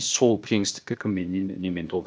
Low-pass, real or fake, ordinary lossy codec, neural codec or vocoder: none; fake; none; codec, 16 kHz, 0.8 kbps, ZipCodec